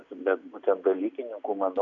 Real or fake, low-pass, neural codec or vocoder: real; 7.2 kHz; none